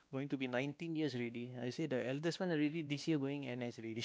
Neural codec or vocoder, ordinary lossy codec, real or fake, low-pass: codec, 16 kHz, 2 kbps, X-Codec, WavLM features, trained on Multilingual LibriSpeech; none; fake; none